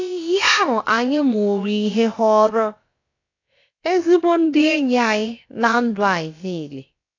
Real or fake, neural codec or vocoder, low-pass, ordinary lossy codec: fake; codec, 16 kHz, about 1 kbps, DyCAST, with the encoder's durations; 7.2 kHz; AAC, 48 kbps